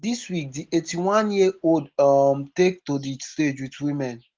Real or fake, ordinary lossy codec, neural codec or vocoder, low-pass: real; Opus, 16 kbps; none; 7.2 kHz